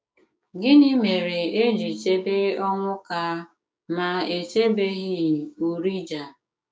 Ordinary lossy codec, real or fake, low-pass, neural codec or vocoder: none; fake; none; codec, 16 kHz, 6 kbps, DAC